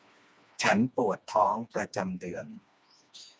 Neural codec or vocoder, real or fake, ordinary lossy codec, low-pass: codec, 16 kHz, 2 kbps, FreqCodec, smaller model; fake; none; none